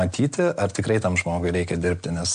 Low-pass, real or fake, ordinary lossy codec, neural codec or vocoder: 9.9 kHz; real; MP3, 64 kbps; none